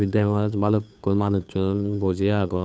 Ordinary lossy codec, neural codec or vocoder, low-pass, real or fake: none; codec, 16 kHz, 2 kbps, FunCodec, trained on Chinese and English, 25 frames a second; none; fake